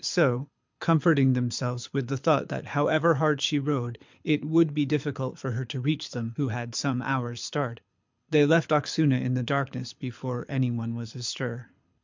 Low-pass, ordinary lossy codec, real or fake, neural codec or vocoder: 7.2 kHz; MP3, 64 kbps; fake; codec, 24 kHz, 6 kbps, HILCodec